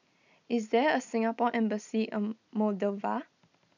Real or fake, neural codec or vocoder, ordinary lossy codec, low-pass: real; none; none; 7.2 kHz